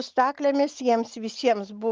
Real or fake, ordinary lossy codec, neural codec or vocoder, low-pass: real; Opus, 32 kbps; none; 7.2 kHz